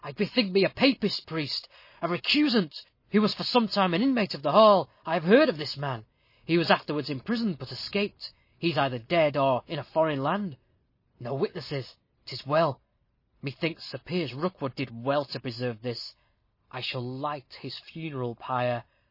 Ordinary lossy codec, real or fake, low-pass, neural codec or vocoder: MP3, 24 kbps; real; 5.4 kHz; none